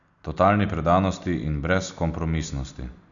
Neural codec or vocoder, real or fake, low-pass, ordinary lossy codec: none; real; 7.2 kHz; none